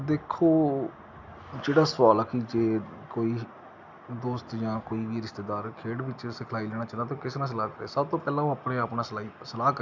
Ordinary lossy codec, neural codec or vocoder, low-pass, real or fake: none; none; 7.2 kHz; real